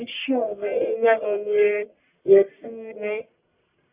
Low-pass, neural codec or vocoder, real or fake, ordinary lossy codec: 3.6 kHz; codec, 44.1 kHz, 1.7 kbps, Pupu-Codec; fake; Opus, 64 kbps